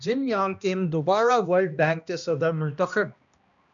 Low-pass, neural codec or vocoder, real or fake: 7.2 kHz; codec, 16 kHz, 1 kbps, X-Codec, HuBERT features, trained on balanced general audio; fake